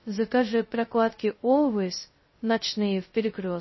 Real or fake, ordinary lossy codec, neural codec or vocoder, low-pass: fake; MP3, 24 kbps; codec, 16 kHz, 0.2 kbps, FocalCodec; 7.2 kHz